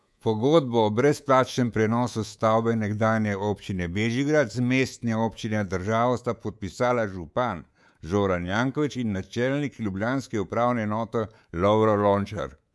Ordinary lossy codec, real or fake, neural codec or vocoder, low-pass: none; fake; codec, 24 kHz, 3.1 kbps, DualCodec; none